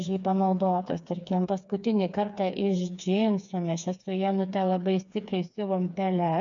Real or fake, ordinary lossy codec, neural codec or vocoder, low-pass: fake; MP3, 96 kbps; codec, 16 kHz, 4 kbps, FreqCodec, smaller model; 7.2 kHz